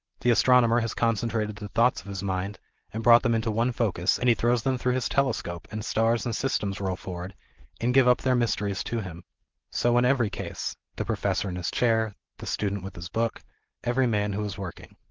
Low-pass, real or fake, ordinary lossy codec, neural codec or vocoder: 7.2 kHz; real; Opus, 16 kbps; none